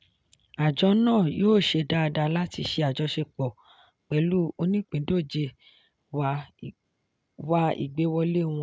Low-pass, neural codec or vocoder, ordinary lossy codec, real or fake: none; none; none; real